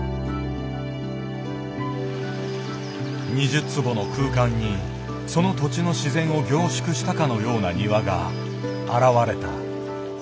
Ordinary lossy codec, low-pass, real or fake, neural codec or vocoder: none; none; real; none